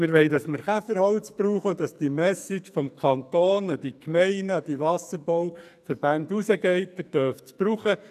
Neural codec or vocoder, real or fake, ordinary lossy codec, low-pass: codec, 44.1 kHz, 2.6 kbps, SNAC; fake; none; 14.4 kHz